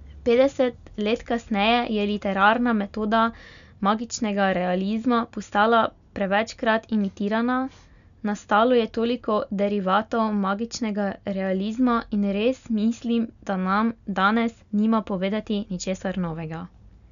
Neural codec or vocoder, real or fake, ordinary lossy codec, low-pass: none; real; none; 7.2 kHz